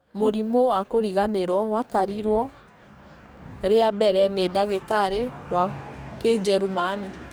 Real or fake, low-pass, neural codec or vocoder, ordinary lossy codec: fake; none; codec, 44.1 kHz, 2.6 kbps, DAC; none